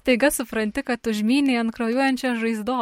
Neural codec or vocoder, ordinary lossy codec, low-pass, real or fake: none; MP3, 64 kbps; 19.8 kHz; real